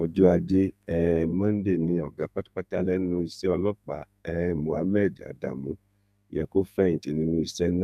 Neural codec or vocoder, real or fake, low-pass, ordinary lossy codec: codec, 32 kHz, 1.9 kbps, SNAC; fake; 14.4 kHz; none